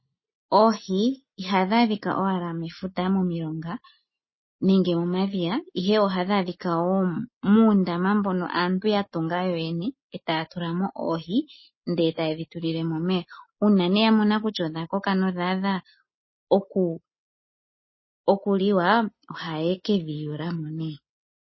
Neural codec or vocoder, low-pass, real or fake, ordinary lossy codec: none; 7.2 kHz; real; MP3, 24 kbps